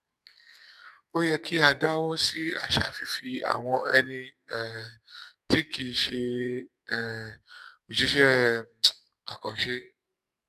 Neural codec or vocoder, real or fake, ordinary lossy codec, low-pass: codec, 44.1 kHz, 2.6 kbps, SNAC; fake; none; 14.4 kHz